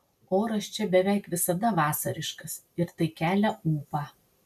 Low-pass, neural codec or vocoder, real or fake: 14.4 kHz; none; real